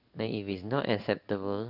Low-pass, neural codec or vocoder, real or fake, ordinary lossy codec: 5.4 kHz; codec, 16 kHz in and 24 kHz out, 1 kbps, XY-Tokenizer; fake; none